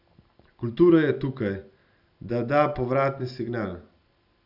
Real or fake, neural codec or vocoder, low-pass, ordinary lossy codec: real; none; 5.4 kHz; none